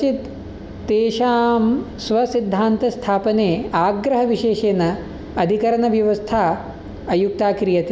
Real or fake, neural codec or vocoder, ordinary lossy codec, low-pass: real; none; none; none